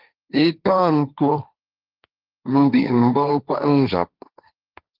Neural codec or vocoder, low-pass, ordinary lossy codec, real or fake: codec, 16 kHz, 1.1 kbps, Voila-Tokenizer; 5.4 kHz; Opus, 32 kbps; fake